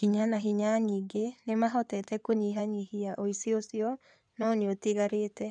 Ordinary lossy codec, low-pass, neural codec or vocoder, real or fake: none; 9.9 kHz; codec, 16 kHz in and 24 kHz out, 2.2 kbps, FireRedTTS-2 codec; fake